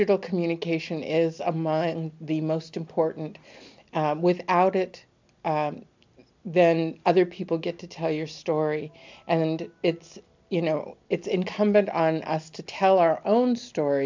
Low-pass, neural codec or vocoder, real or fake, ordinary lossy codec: 7.2 kHz; none; real; MP3, 64 kbps